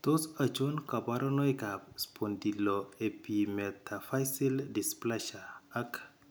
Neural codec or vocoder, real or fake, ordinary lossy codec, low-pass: none; real; none; none